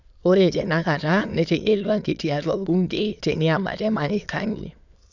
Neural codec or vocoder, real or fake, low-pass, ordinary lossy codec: autoencoder, 22.05 kHz, a latent of 192 numbers a frame, VITS, trained on many speakers; fake; 7.2 kHz; none